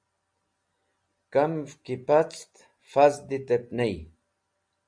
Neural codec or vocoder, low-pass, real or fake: none; 9.9 kHz; real